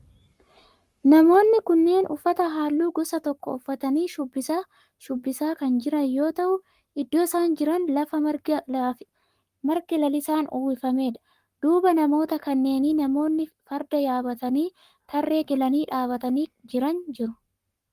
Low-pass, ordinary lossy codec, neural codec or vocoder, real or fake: 14.4 kHz; Opus, 32 kbps; codec, 44.1 kHz, 7.8 kbps, Pupu-Codec; fake